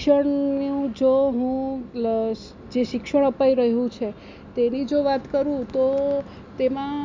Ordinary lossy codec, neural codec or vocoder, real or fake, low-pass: MP3, 64 kbps; none; real; 7.2 kHz